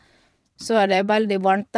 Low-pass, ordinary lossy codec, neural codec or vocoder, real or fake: none; none; vocoder, 22.05 kHz, 80 mel bands, WaveNeXt; fake